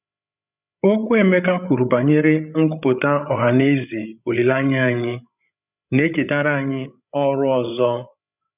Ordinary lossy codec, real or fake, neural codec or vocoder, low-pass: none; fake; codec, 16 kHz, 8 kbps, FreqCodec, larger model; 3.6 kHz